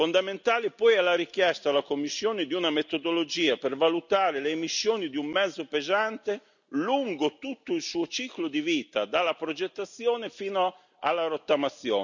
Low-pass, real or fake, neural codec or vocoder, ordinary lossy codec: 7.2 kHz; real; none; none